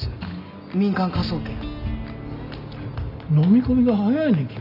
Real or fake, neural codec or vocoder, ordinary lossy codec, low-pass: real; none; none; 5.4 kHz